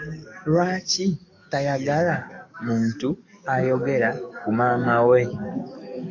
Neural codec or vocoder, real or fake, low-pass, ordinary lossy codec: codec, 44.1 kHz, 7.8 kbps, DAC; fake; 7.2 kHz; MP3, 64 kbps